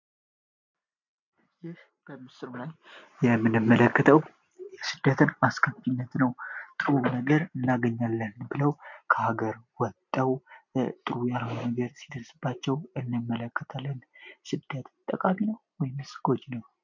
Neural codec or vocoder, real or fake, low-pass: none; real; 7.2 kHz